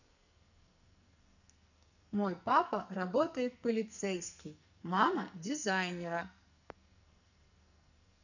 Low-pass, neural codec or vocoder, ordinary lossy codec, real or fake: 7.2 kHz; codec, 44.1 kHz, 2.6 kbps, SNAC; none; fake